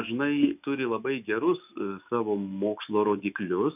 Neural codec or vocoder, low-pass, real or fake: autoencoder, 48 kHz, 128 numbers a frame, DAC-VAE, trained on Japanese speech; 3.6 kHz; fake